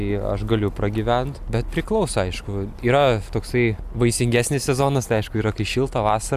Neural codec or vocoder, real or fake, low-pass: none; real; 14.4 kHz